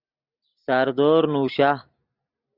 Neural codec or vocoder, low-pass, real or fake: none; 5.4 kHz; real